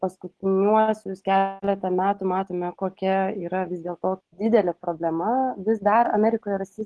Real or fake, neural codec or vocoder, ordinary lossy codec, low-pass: real; none; Opus, 16 kbps; 10.8 kHz